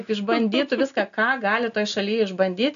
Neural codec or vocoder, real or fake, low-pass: none; real; 7.2 kHz